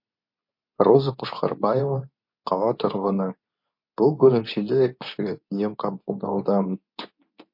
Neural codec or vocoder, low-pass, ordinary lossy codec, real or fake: codec, 24 kHz, 0.9 kbps, WavTokenizer, medium speech release version 2; 5.4 kHz; MP3, 32 kbps; fake